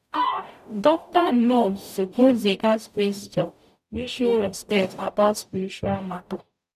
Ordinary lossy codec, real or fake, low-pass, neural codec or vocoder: none; fake; 14.4 kHz; codec, 44.1 kHz, 0.9 kbps, DAC